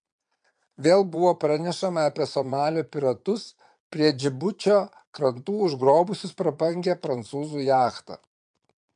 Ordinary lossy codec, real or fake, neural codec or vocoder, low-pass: MP3, 64 kbps; fake; vocoder, 22.05 kHz, 80 mel bands, Vocos; 9.9 kHz